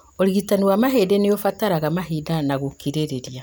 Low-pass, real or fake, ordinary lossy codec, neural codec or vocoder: none; real; none; none